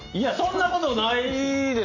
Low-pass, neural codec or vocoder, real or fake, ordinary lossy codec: 7.2 kHz; none; real; AAC, 48 kbps